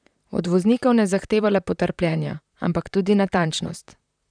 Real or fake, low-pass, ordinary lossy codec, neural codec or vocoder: fake; 9.9 kHz; none; vocoder, 44.1 kHz, 128 mel bands, Pupu-Vocoder